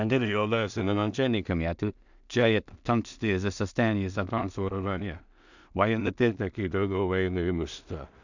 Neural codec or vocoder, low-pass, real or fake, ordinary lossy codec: codec, 16 kHz in and 24 kHz out, 0.4 kbps, LongCat-Audio-Codec, two codebook decoder; 7.2 kHz; fake; none